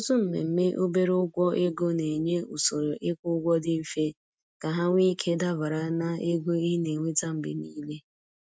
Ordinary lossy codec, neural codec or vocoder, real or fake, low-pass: none; none; real; none